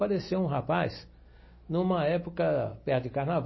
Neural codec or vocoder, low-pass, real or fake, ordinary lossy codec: none; 7.2 kHz; real; MP3, 24 kbps